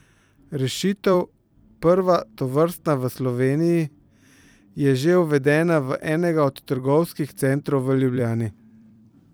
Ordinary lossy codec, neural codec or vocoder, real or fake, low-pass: none; vocoder, 44.1 kHz, 128 mel bands every 256 samples, BigVGAN v2; fake; none